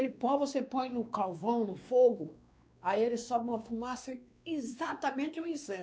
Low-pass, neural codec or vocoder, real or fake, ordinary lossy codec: none; codec, 16 kHz, 2 kbps, X-Codec, WavLM features, trained on Multilingual LibriSpeech; fake; none